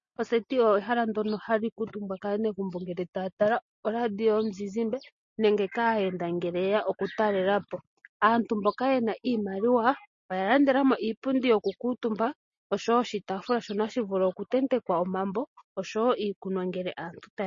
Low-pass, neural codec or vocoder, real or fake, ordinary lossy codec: 7.2 kHz; none; real; MP3, 32 kbps